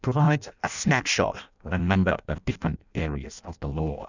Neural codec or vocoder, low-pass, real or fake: codec, 16 kHz in and 24 kHz out, 0.6 kbps, FireRedTTS-2 codec; 7.2 kHz; fake